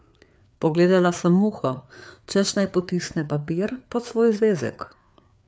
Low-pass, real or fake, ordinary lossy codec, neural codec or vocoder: none; fake; none; codec, 16 kHz, 4 kbps, FreqCodec, larger model